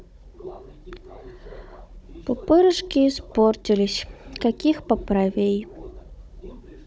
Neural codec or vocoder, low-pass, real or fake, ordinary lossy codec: codec, 16 kHz, 16 kbps, FunCodec, trained on Chinese and English, 50 frames a second; none; fake; none